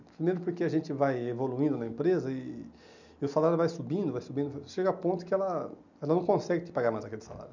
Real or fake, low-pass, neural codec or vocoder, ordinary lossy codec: real; 7.2 kHz; none; none